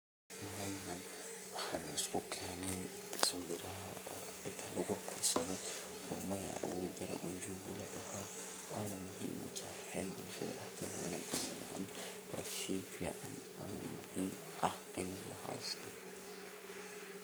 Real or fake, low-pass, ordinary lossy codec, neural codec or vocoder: fake; none; none; codec, 44.1 kHz, 3.4 kbps, Pupu-Codec